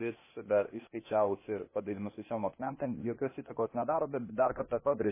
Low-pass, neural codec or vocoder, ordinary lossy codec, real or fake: 3.6 kHz; codec, 16 kHz, 0.8 kbps, ZipCodec; MP3, 16 kbps; fake